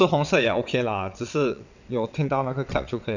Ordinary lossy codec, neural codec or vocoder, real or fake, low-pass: none; vocoder, 22.05 kHz, 80 mel bands, WaveNeXt; fake; 7.2 kHz